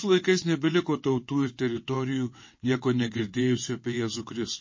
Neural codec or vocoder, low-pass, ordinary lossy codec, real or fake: vocoder, 22.05 kHz, 80 mel bands, WaveNeXt; 7.2 kHz; MP3, 32 kbps; fake